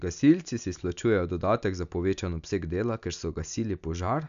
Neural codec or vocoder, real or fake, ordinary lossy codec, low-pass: none; real; MP3, 96 kbps; 7.2 kHz